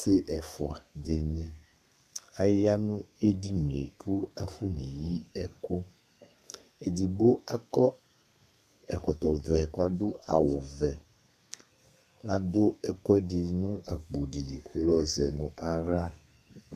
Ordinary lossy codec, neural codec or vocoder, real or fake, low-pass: MP3, 96 kbps; codec, 32 kHz, 1.9 kbps, SNAC; fake; 14.4 kHz